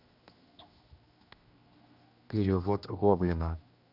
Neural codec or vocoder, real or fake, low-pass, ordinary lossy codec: codec, 16 kHz, 1 kbps, X-Codec, HuBERT features, trained on general audio; fake; 5.4 kHz; none